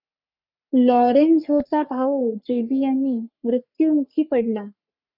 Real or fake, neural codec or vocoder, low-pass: fake; codec, 44.1 kHz, 3.4 kbps, Pupu-Codec; 5.4 kHz